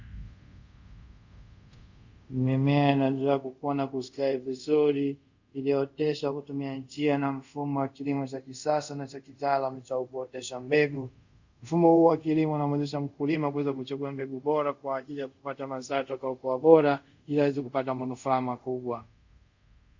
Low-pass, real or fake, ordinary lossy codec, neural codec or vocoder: 7.2 kHz; fake; Opus, 64 kbps; codec, 24 kHz, 0.5 kbps, DualCodec